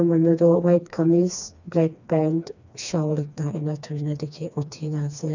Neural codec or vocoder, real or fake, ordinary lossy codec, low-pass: codec, 16 kHz, 2 kbps, FreqCodec, smaller model; fake; none; 7.2 kHz